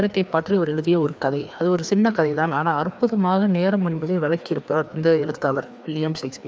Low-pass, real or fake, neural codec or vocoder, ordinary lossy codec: none; fake; codec, 16 kHz, 2 kbps, FreqCodec, larger model; none